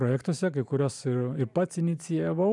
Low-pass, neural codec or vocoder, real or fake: 10.8 kHz; vocoder, 44.1 kHz, 128 mel bands every 512 samples, BigVGAN v2; fake